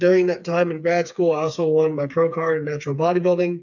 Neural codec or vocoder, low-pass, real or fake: codec, 16 kHz, 4 kbps, FreqCodec, smaller model; 7.2 kHz; fake